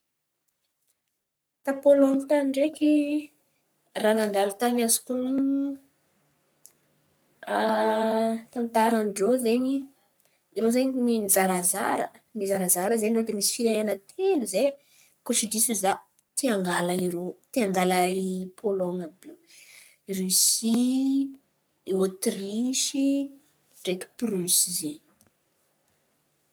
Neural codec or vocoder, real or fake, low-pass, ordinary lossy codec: codec, 44.1 kHz, 3.4 kbps, Pupu-Codec; fake; none; none